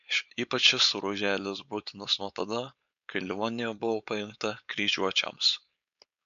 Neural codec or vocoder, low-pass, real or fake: codec, 16 kHz, 4.8 kbps, FACodec; 7.2 kHz; fake